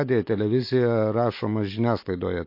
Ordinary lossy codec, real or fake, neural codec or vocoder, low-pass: MP3, 32 kbps; real; none; 5.4 kHz